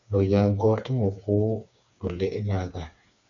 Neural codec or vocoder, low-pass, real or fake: codec, 16 kHz, 4 kbps, FreqCodec, smaller model; 7.2 kHz; fake